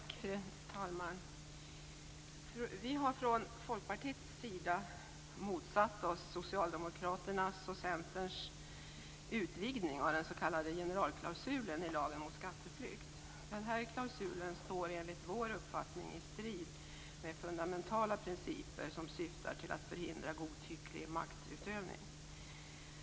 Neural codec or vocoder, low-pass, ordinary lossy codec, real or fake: none; none; none; real